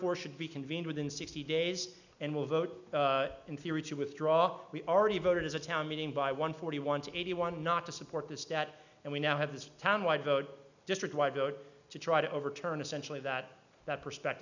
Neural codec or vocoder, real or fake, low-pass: none; real; 7.2 kHz